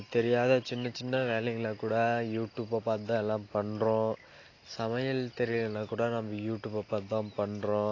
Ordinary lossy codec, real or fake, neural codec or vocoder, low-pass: AAC, 32 kbps; real; none; 7.2 kHz